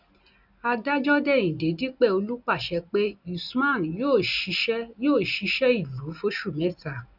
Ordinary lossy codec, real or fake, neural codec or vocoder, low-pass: none; real; none; 5.4 kHz